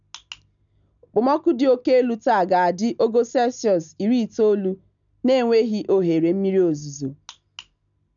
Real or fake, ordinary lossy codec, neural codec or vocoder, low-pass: real; none; none; 7.2 kHz